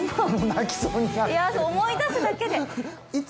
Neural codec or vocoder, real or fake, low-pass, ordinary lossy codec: none; real; none; none